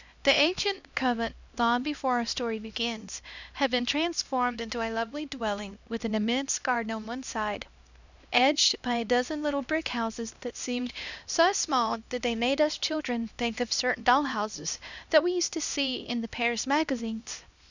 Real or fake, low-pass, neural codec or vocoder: fake; 7.2 kHz; codec, 16 kHz, 1 kbps, X-Codec, HuBERT features, trained on LibriSpeech